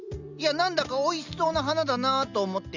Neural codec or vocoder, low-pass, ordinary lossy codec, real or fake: none; 7.2 kHz; Opus, 64 kbps; real